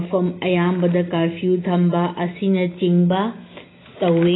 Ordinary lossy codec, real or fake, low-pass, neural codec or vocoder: AAC, 16 kbps; real; 7.2 kHz; none